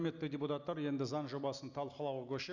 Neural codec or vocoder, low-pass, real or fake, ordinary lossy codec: none; 7.2 kHz; real; none